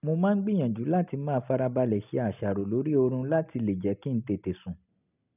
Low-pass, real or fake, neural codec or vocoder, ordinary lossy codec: 3.6 kHz; real; none; none